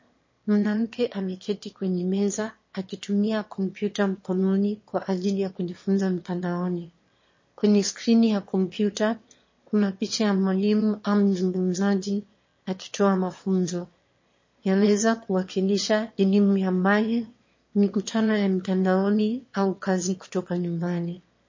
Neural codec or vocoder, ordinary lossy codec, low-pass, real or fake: autoencoder, 22.05 kHz, a latent of 192 numbers a frame, VITS, trained on one speaker; MP3, 32 kbps; 7.2 kHz; fake